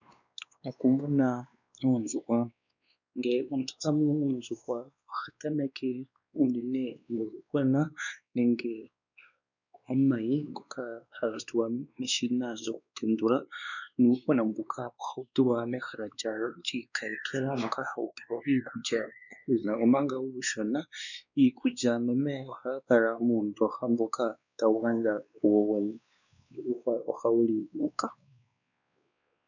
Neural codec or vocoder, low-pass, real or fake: codec, 16 kHz, 2 kbps, X-Codec, WavLM features, trained on Multilingual LibriSpeech; 7.2 kHz; fake